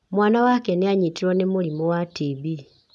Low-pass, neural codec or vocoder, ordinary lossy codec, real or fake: none; none; none; real